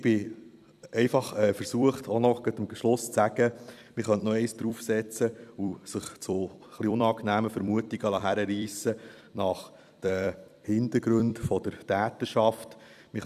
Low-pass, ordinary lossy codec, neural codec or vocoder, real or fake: 14.4 kHz; none; vocoder, 44.1 kHz, 128 mel bands every 256 samples, BigVGAN v2; fake